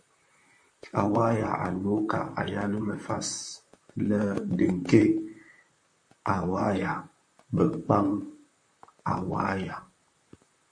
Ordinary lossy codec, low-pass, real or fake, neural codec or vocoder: MP3, 48 kbps; 9.9 kHz; fake; vocoder, 44.1 kHz, 128 mel bands, Pupu-Vocoder